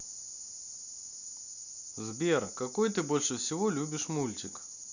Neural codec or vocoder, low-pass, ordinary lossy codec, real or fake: none; 7.2 kHz; none; real